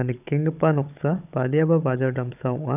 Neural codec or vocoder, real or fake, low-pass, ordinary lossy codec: codec, 16 kHz, 16 kbps, FunCodec, trained on Chinese and English, 50 frames a second; fake; 3.6 kHz; none